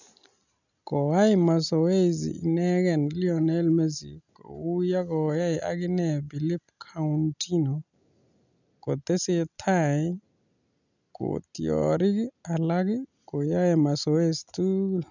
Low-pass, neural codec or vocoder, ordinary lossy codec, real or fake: 7.2 kHz; none; none; real